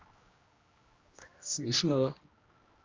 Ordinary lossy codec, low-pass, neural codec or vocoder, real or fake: Opus, 32 kbps; 7.2 kHz; codec, 16 kHz, 1 kbps, X-Codec, HuBERT features, trained on general audio; fake